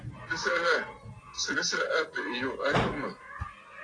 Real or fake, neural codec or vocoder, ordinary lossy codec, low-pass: real; none; AAC, 32 kbps; 9.9 kHz